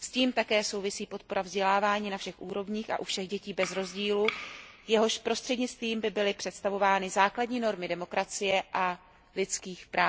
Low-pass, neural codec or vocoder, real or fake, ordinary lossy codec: none; none; real; none